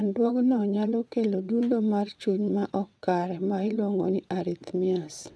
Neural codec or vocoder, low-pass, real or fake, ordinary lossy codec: vocoder, 22.05 kHz, 80 mel bands, WaveNeXt; none; fake; none